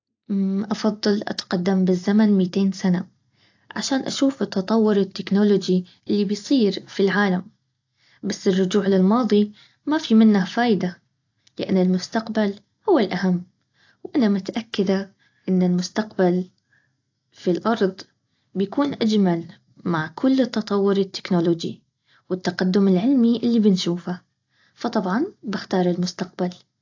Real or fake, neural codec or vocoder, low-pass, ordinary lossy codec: real; none; 7.2 kHz; AAC, 48 kbps